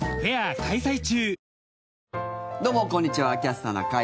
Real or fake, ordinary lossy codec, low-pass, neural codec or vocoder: real; none; none; none